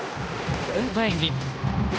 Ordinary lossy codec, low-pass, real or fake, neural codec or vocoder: none; none; fake; codec, 16 kHz, 1 kbps, X-Codec, HuBERT features, trained on balanced general audio